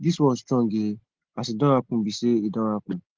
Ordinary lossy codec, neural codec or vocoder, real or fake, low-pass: Opus, 16 kbps; none; real; 7.2 kHz